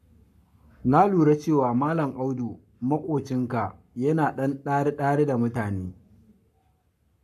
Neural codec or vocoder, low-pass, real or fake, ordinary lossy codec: codec, 44.1 kHz, 7.8 kbps, Pupu-Codec; 14.4 kHz; fake; AAC, 96 kbps